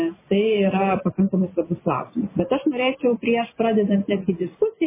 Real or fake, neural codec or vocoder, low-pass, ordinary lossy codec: real; none; 3.6 kHz; MP3, 16 kbps